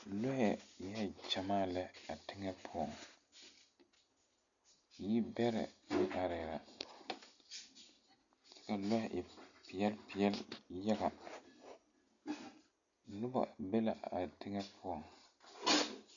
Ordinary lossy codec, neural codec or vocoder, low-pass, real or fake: MP3, 96 kbps; none; 7.2 kHz; real